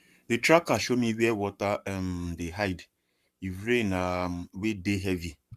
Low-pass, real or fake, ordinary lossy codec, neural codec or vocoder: 14.4 kHz; fake; Opus, 64 kbps; codec, 44.1 kHz, 7.8 kbps, Pupu-Codec